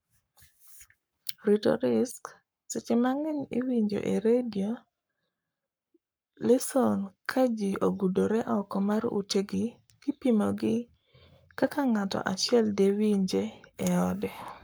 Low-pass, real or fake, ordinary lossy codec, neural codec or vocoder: none; fake; none; codec, 44.1 kHz, 7.8 kbps, Pupu-Codec